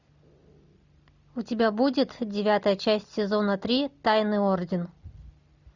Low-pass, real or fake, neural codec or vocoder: 7.2 kHz; real; none